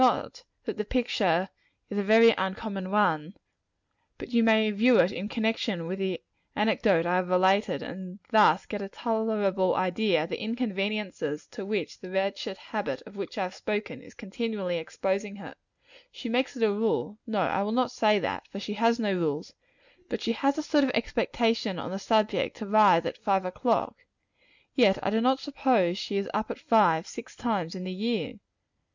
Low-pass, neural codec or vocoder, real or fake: 7.2 kHz; none; real